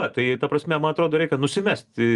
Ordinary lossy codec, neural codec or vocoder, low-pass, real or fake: Opus, 32 kbps; none; 10.8 kHz; real